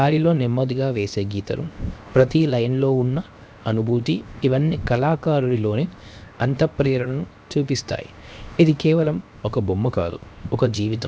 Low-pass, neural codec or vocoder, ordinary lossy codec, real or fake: none; codec, 16 kHz, 0.7 kbps, FocalCodec; none; fake